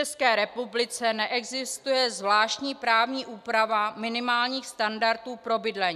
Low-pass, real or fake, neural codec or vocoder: 14.4 kHz; real; none